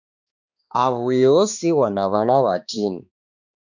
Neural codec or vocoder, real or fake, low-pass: codec, 16 kHz, 2 kbps, X-Codec, HuBERT features, trained on balanced general audio; fake; 7.2 kHz